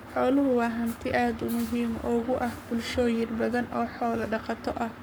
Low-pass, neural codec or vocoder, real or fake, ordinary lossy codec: none; codec, 44.1 kHz, 7.8 kbps, Pupu-Codec; fake; none